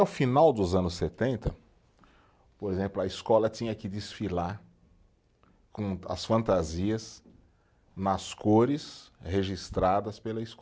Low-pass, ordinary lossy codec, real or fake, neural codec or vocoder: none; none; real; none